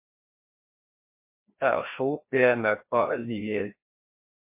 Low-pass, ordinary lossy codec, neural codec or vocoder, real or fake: 3.6 kHz; MP3, 24 kbps; codec, 16 kHz, 1 kbps, FreqCodec, larger model; fake